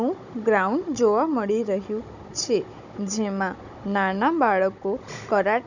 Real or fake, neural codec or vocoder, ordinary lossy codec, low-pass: fake; codec, 16 kHz, 16 kbps, FunCodec, trained on Chinese and English, 50 frames a second; none; 7.2 kHz